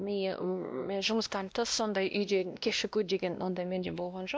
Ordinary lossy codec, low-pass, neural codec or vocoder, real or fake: none; none; codec, 16 kHz, 1 kbps, X-Codec, WavLM features, trained on Multilingual LibriSpeech; fake